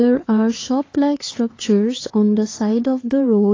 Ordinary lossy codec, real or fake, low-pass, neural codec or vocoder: AAC, 32 kbps; fake; 7.2 kHz; codec, 16 kHz, 4 kbps, X-Codec, HuBERT features, trained on LibriSpeech